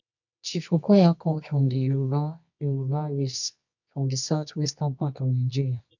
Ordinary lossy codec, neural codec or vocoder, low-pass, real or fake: none; codec, 24 kHz, 0.9 kbps, WavTokenizer, medium music audio release; 7.2 kHz; fake